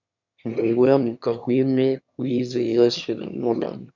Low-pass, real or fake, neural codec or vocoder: 7.2 kHz; fake; autoencoder, 22.05 kHz, a latent of 192 numbers a frame, VITS, trained on one speaker